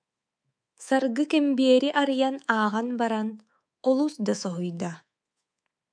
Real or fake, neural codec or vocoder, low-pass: fake; codec, 24 kHz, 3.1 kbps, DualCodec; 9.9 kHz